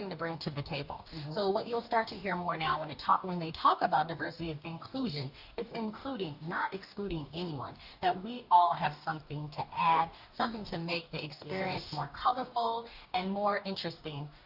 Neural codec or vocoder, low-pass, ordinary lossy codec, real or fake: codec, 44.1 kHz, 2.6 kbps, DAC; 5.4 kHz; Opus, 64 kbps; fake